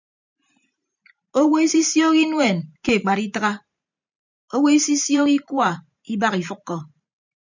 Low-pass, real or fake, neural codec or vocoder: 7.2 kHz; fake; vocoder, 44.1 kHz, 128 mel bands every 512 samples, BigVGAN v2